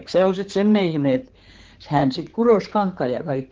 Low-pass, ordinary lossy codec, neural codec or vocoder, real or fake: 7.2 kHz; Opus, 16 kbps; codec, 16 kHz, 4 kbps, X-Codec, HuBERT features, trained on general audio; fake